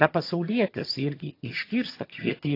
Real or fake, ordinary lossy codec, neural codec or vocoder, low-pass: fake; AAC, 32 kbps; vocoder, 22.05 kHz, 80 mel bands, HiFi-GAN; 5.4 kHz